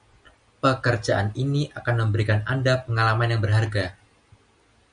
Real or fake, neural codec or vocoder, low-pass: real; none; 9.9 kHz